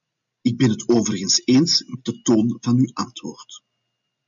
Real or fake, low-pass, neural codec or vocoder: real; 7.2 kHz; none